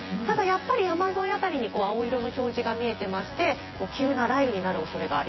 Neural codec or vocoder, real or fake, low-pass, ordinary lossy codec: vocoder, 24 kHz, 100 mel bands, Vocos; fake; 7.2 kHz; MP3, 24 kbps